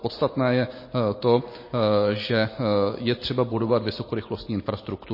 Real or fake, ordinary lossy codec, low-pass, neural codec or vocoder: real; MP3, 24 kbps; 5.4 kHz; none